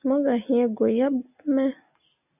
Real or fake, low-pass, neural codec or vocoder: real; 3.6 kHz; none